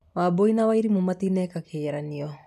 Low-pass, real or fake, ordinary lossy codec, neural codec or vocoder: 14.4 kHz; real; none; none